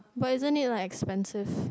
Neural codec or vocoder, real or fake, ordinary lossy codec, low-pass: none; real; none; none